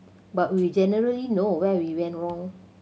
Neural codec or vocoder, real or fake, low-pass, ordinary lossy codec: none; real; none; none